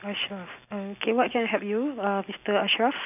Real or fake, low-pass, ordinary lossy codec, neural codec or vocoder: real; 3.6 kHz; none; none